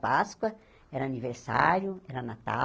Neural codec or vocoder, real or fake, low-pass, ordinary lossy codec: none; real; none; none